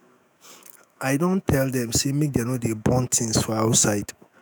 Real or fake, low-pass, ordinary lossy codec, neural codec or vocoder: fake; none; none; autoencoder, 48 kHz, 128 numbers a frame, DAC-VAE, trained on Japanese speech